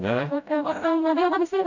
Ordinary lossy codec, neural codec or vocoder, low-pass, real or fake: none; codec, 16 kHz, 0.5 kbps, FreqCodec, smaller model; 7.2 kHz; fake